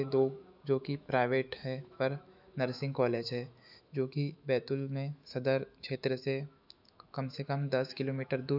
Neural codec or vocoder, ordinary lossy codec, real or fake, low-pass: autoencoder, 48 kHz, 128 numbers a frame, DAC-VAE, trained on Japanese speech; none; fake; 5.4 kHz